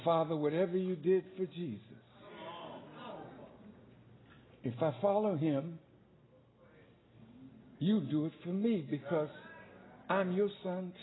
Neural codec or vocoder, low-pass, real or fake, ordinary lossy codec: none; 7.2 kHz; real; AAC, 16 kbps